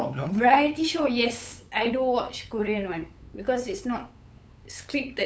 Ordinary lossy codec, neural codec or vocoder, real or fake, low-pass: none; codec, 16 kHz, 8 kbps, FunCodec, trained on LibriTTS, 25 frames a second; fake; none